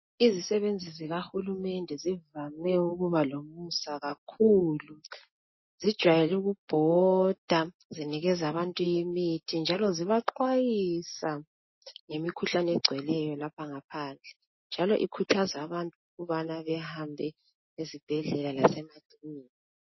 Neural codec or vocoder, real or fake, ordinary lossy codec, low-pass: none; real; MP3, 24 kbps; 7.2 kHz